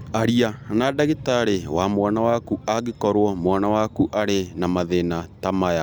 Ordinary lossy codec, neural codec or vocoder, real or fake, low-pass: none; none; real; none